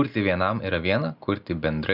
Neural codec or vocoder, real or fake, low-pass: none; real; 5.4 kHz